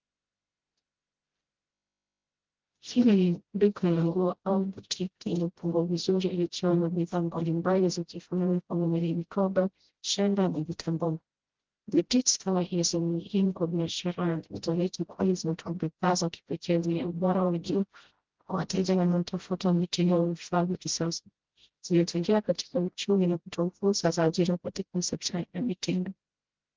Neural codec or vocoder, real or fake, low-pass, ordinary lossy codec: codec, 16 kHz, 0.5 kbps, FreqCodec, smaller model; fake; 7.2 kHz; Opus, 16 kbps